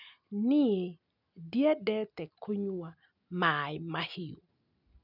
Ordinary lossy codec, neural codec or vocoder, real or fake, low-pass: none; none; real; 5.4 kHz